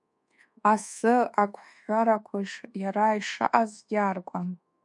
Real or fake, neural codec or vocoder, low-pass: fake; codec, 24 kHz, 1.2 kbps, DualCodec; 10.8 kHz